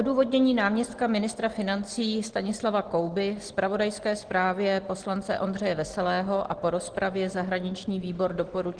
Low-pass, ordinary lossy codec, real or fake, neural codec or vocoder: 9.9 kHz; Opus, 16 kbps; real; none